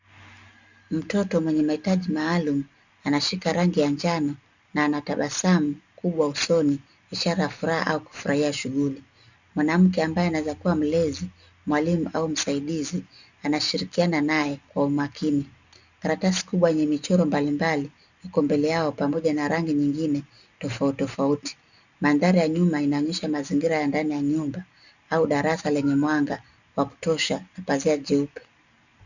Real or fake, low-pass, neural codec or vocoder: real; 7.2 kHz; none